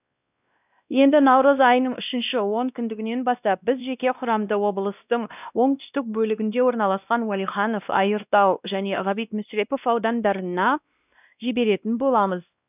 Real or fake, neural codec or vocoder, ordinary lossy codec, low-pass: fake; codec, 16 kHz, 1 kbps, X-Codec, WavLM features, trained on Multilingual LibriSpeech; none; 3.6 kHz